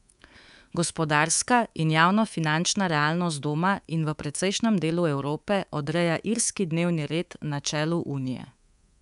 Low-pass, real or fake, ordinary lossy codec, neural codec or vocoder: 10.8 kHz; fake; none; codec, 24 kHz, 3.1 kbps, DualCodec